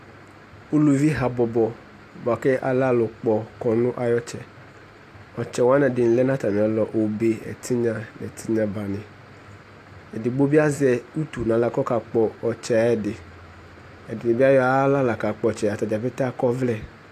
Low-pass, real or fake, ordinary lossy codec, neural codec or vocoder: 14.4 kHz; real; AAC, 64 kbps; none